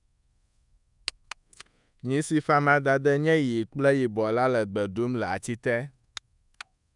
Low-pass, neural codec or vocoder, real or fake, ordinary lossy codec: 10.8 kHz; codec, 24 kHz, 1.2 kbps, DualCodec; fake; none